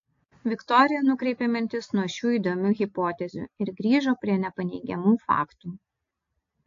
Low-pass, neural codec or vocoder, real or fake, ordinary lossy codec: 7.2 kHz; none; real; AAC, 48 kbps